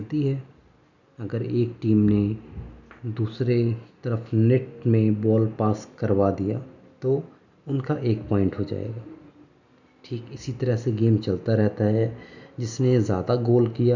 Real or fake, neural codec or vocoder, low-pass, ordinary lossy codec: real; none; 7.2 kHz; none